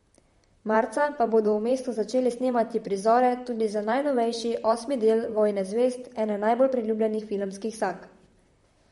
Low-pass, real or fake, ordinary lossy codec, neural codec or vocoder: 19.8 kHz; fake; MP3, 48 kbps; vocoder, 44.1 kHz, 128 mel bands, Pupu-Vocoder